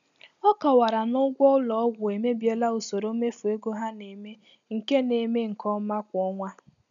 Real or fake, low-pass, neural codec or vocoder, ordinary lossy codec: real; 7.2 kHz; none; none